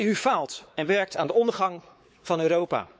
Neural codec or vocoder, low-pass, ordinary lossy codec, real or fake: codec, 16 kHz, 4 kbps, X-Codec, WavLM features, trained on Multilingual LibriSpeech; none; none; fake